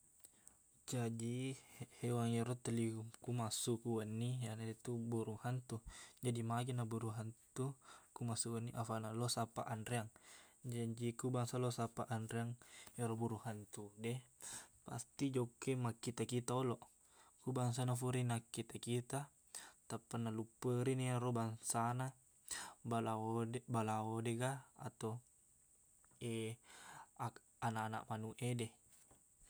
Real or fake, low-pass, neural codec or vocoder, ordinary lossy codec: real; none; none; none